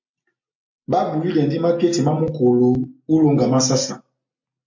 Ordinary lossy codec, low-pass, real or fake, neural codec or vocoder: MP3, 48 kbps; 7.2 kHz; real; none